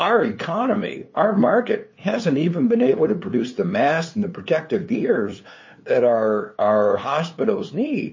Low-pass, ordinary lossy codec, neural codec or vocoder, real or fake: 7.2 kHz; MP3, 32 kbps; codec, 16 kHz, 2 kbps, FunCodec, trained on LibriTTS, 25 frames a second; fake